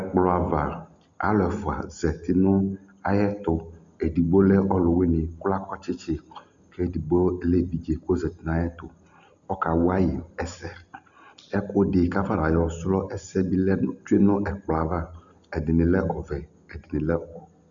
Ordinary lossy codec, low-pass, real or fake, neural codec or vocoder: Opus, 64 kbps; 7.2 kHz; real; none